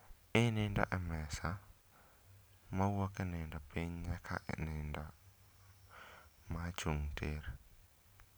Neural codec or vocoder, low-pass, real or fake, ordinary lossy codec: none; none; real; none